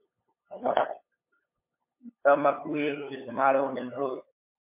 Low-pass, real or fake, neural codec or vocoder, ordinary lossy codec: 3.6 kHz; fake; codec, 16 kHz, 8 kbps, FunCodec, trained on LibriTTS, 25 frames a second; MP3, 24 kbps